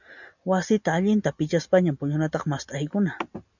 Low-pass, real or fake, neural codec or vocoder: 7.2 kHz; real; none